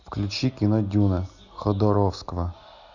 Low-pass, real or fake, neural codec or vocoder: 7.2 kHz; real; none